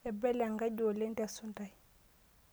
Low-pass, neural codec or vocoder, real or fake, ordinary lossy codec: none; none; real; none